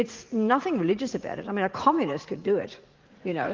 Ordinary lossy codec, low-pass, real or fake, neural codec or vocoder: Opus, 32 kbps; 7.2 kHz; fake; vocoder, 44.1 kHz, 80 mel bands, Vocos